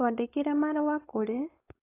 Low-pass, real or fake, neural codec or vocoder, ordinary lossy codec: 3.6 kHz; fake; codec, 16 kHz, 4.8 kbps, FACodec; AAC, 16 kbps